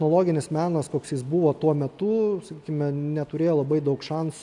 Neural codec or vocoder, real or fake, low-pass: none; real; 10.8 kHz